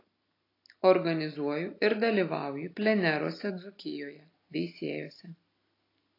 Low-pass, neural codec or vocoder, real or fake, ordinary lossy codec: 5.4 kHz; none; real; AAC, 32 kbps